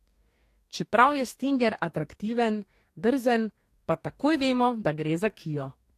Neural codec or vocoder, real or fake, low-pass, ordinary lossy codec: codec, 44.1 kHz, 2.6 kbps, DAC; fake; 14.4 kHz; AAC, 64 kbps